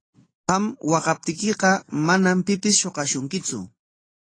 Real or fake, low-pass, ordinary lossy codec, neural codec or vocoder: real; 9.9 kHz; AAC, 32 kbps; none